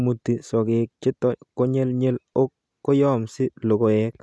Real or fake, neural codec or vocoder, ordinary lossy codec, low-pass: real; none; none; none